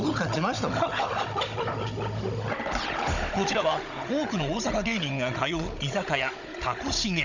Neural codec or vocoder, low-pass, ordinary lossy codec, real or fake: codec, 16 kHz, 16 kbps, FunCodec, trained on Chinese and English, 50 frames a second; 7.2 kHz; none; fake